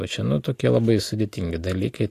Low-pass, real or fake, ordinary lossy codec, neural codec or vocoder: 14.4 kHz; real; AAC, 64 kbps; none